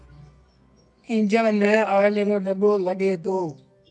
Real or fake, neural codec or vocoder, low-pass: fake; codec, 24 kHz, 0.9 kbps, WavTokenizer, medium music audio release; 10.8 kHz